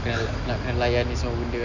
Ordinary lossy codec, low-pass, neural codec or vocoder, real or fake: none; 7.2 kHz; none; real